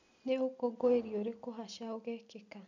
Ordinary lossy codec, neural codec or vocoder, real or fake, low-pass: none; none; real; 7.2 kHz